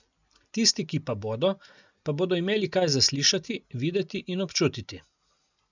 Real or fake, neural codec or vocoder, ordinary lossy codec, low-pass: real; none; none; 7.2 kHz